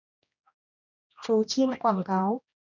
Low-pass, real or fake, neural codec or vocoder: 7.2 kHz; fake; codec, 16 kHz, 1 kbps, X-Codec, HuBERT features, trained on general audio